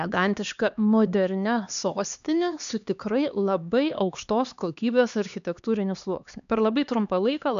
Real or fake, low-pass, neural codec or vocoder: fake; 7.2 kHz; codec, 16 kHz, 2 kbps, X-Codec, HuBERT features, trained on LibriSpeech